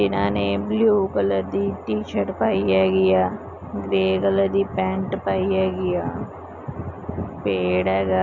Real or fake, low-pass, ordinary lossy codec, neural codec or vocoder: real; 7.2 kHz; none; none